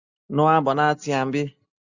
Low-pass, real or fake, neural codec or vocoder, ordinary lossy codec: 7.2 kHz; real; none; Opus, 64 kbps